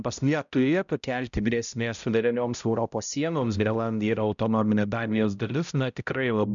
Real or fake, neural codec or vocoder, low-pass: fake; codec, 16 kHz, 0.5 kbps, X-Codec, HuBERT features, trained on balanced general audio; 7.2 kHz